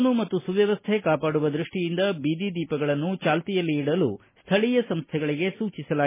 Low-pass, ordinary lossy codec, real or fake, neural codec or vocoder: 3.6 kHz; MP3, 16 kbps; real; none